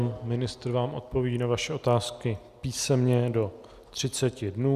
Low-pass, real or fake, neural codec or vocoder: 14.4 kHz; real; none